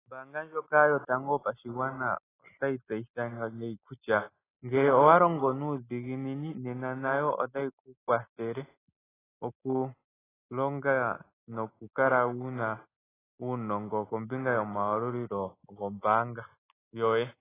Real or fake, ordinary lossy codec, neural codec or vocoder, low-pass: real; AAC, 16 kbps; none; 3.6 kHz